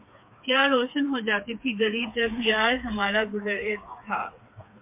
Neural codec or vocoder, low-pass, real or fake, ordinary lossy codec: codec, 16 kHz, 4 kbps, FreqCodec, smaller model; 3.6 kHz; fake; MP3, 32 kbps